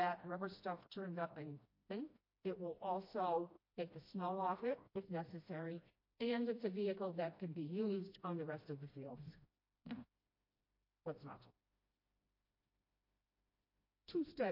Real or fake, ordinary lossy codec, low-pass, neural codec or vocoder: fake; MP3, 32 kbps; 5.4 kHz; codec, 16 kHz, 1 kbps, FreqCodec, smaller model